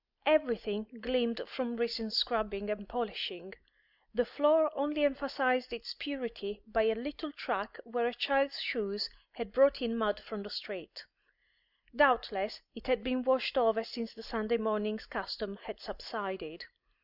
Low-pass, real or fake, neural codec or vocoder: 5.4 kHz; real; none